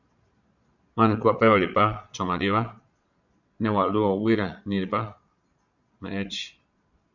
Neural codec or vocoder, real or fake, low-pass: vocoder, 22.05 kHz, 80 mel bands, Vocos; fake; 7.2 kHz